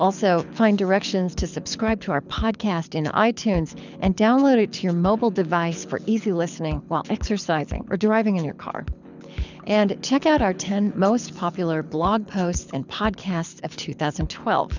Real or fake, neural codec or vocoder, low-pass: fake; codec, 24 kHz, 6 kbps, HILCodec; 7.2 kHz